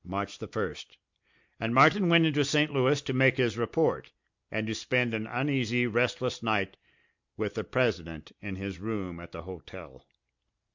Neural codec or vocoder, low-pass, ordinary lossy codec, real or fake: none; 7.2 kHz; MP3, 64 kbps; real